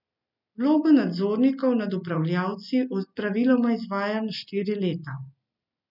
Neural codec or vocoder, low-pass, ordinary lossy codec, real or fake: none; 5.4 kHz; none; real